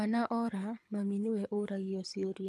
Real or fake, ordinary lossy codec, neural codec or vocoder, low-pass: fake; none; codec, 24 kHz, 6 kbps, HILCodec; none